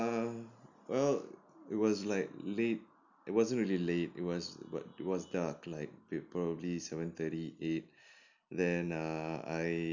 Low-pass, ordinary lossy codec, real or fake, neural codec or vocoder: 7.2 kHz; none; real; none